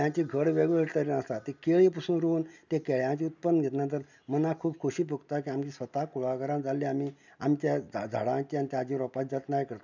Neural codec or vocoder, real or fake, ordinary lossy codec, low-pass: none; real; none; 7.2 kHz